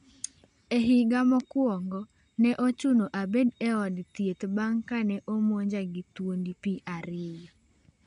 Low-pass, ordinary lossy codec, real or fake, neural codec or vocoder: 9.9 kHz; none; real; none